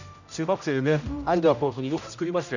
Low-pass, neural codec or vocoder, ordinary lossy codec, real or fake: 7.2 kHz; codec, 16 kHz, 0.5 kbps, X-Codec, HuBERT features, trained on general audio; none; fake